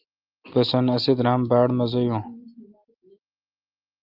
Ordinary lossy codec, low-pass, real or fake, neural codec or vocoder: Opus, 32 kbps; 5.4 kHz; real; none